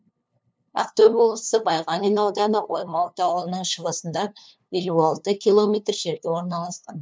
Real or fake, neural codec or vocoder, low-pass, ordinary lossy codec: fake; codec, 16 kHz, 2 kbps, FunCodec, trained on LibriTTS, 25 frames a second; none; none